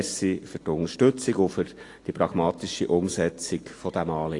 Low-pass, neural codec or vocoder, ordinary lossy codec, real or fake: 10.8 kHz; none; AAC, 48 kbps; real